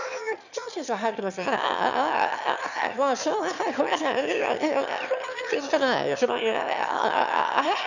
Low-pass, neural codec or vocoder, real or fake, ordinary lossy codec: 7.2 kHz; autoencoder, 22.05 kHz, a latent of 192 numbers a frame, VITS, trained on one speaker; fake; none